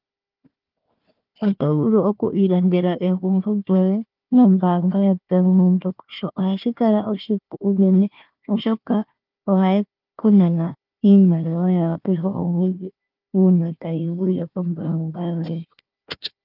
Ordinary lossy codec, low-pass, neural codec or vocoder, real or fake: Opus, 24 kbps; 5.4 kHz; codec, 16 kHz, 1 kbps, FunCodec, trained on Chinese and English, 50 frames a second; fake